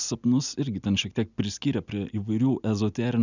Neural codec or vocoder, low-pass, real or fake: none; 7.2 kHz; real